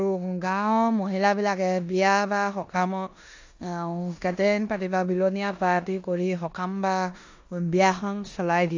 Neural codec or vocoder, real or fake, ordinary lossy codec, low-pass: codec, 16 kHz in and 24 kHz out, 0.9 kbps, LongCat-Audio-Codec, four codebook decoder; fake; AAC, 48 kbps; 7.2 kHz